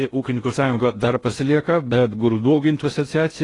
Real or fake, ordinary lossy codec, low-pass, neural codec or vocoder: fake; AAC, 32 kbps; 10.8 kHz; codec, 16 kHz in and 24 kHz out, 0.6 kbps, FocalCodec, streaming, 4096 codes